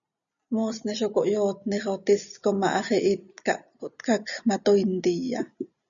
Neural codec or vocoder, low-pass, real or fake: none; 7.2 kHz; real